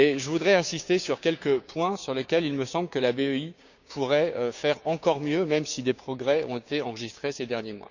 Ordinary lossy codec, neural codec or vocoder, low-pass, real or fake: none; codec, 16 kHz, 6 kbps, DAC; 7.2 kHz; fake